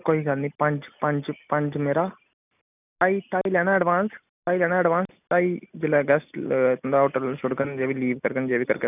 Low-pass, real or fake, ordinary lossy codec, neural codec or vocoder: 3.6 kHz; real; none; none